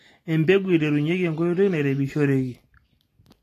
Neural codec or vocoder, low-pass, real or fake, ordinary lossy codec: none; 14.4 kHz; real; AAC, 48 kbps